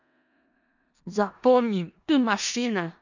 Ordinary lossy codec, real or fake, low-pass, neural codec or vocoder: none; fake; 7.2 kHz; codec, 16 kHz in and 24 kHz out, 0.4 kbps, LongCat-Audio-Codec, four codebook decoder